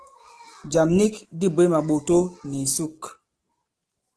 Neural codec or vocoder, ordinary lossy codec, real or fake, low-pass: autoencoder, 48 kHz, 128 numbers a frame, DAC-VAE, trained on Japanese speech; Opus, 16 kbps; fake; 10.8 kHz